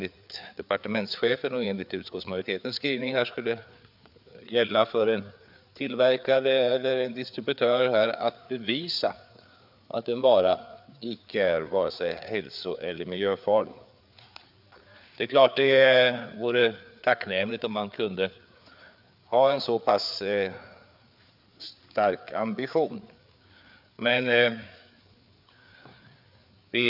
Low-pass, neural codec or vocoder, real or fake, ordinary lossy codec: 5.4 kHz; codec, 16 kHz, 4 kbps, FreqCodec, larger model; fake; none